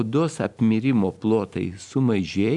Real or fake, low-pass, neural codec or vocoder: real; 10.8 kHz; none